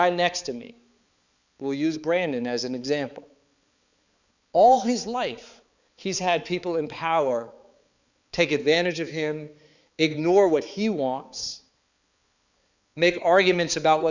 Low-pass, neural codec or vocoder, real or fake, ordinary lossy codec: 7.2 kHz; codec, 16 kHz, 4 kbps, X-Codec, HuBERT features, trained on balanced general audio; fake; Opus, 64 kbps